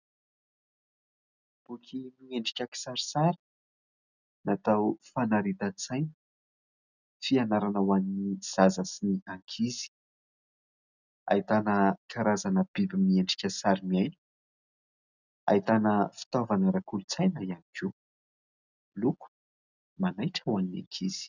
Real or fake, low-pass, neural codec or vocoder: real; 7.2 kHz; none